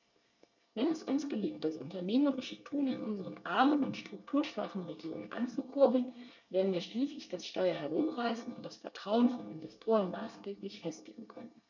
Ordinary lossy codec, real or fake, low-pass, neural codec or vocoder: none; fake; 7.2 kHz; codec, 24 kHz, 1 kbps, SNAC